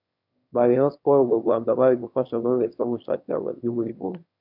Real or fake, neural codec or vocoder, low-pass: fake; autoencoder, 22.05 kHz, a latent of 192 numbers a frame, VITS, trained on one speaker; 5.4 kHz